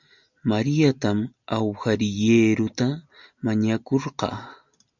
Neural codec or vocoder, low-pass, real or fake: none; 7.2 kHz; real